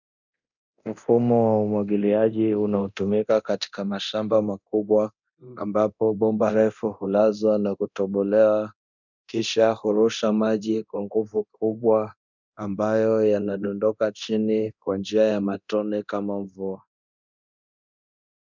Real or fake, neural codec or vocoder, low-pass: fake; codec, 24 kHz, 0.9 kbps, DualCodec; 7.2 kHz